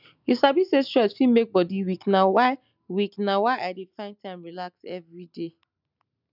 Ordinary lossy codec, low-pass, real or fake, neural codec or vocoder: none; 5.4 kHz; real; none